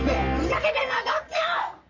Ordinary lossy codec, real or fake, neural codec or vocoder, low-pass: none; fake; codec, 44.1 kHz, 3.4 kbps, Pupu-Codec; 7.2 kHz